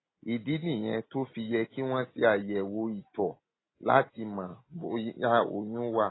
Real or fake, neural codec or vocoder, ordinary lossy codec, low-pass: real; none; AAC, 16 kbps; 7.2 kHz